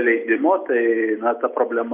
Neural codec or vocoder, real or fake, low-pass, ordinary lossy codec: none; real; 3.6 kHz; Opus, 64 kbps